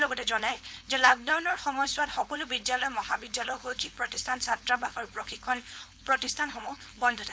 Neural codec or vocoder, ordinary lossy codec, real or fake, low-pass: codec, 16 kHz, 4.8 kbps, FACodec; none; fake; none